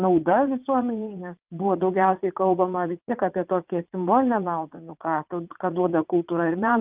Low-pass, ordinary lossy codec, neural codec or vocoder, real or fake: 3.6 kHz; Opus, 32 kbps; vocoder, 44.1 kHz, 80 mel bands, Vocos; fake